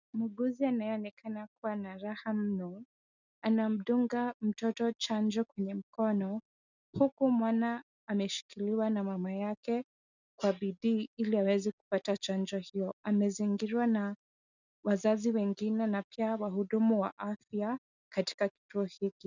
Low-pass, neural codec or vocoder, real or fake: 7.2 kHz; none; real